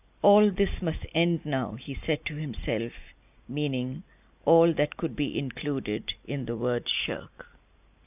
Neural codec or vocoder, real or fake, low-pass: none; real; 3.6 kHz